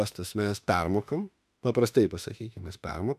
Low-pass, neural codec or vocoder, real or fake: 14.4 kHz; autoencoder, 48 kHz, 32 numbers a frame, DAC-VAE, trained on Japanese speech; fake